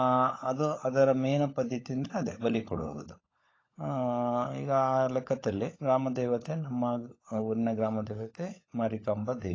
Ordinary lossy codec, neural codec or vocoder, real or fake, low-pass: AAC, 32 kbps; codec, 16 kHz, 16 kbps, FunCodec, trained on LibriTTS, 50 frames a second; fake; 7.2 kHz